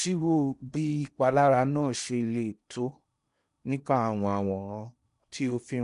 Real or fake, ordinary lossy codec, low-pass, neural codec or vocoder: fake; none; 10.8 kHz; codec, 16 kHz in and 24 kHz out, 0.8 kbps, FocalCodec, streaming, 65536 codes